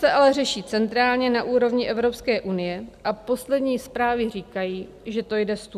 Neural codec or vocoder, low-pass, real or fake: none; 14.4 kHz; real